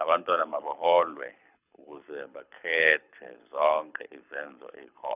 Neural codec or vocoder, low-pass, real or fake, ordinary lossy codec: codec, 24 kHz, 6 kbps, HILCodec; 3.6 kHz; fake; none